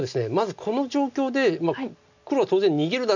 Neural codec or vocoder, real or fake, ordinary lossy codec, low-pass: none; real; none; 7.2 kHz